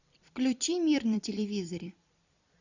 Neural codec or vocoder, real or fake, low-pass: vocoder, 44.1 kHz, 128 mel bands every 256 samples, BigVGAN v2; fake; 7.2 kHz